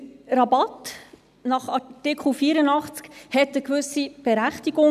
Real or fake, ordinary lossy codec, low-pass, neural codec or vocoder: real; none; 14.4 kHz; none